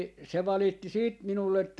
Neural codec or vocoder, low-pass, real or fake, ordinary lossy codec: none; none; real; none